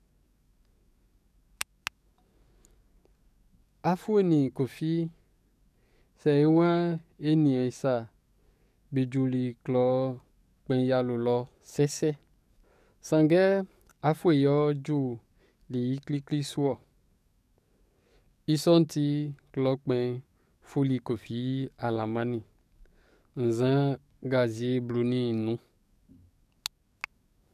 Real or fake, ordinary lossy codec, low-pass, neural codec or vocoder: fake; none; 14.4 kHz; codec, 44.1 kHz, 7.8 kbps, DAC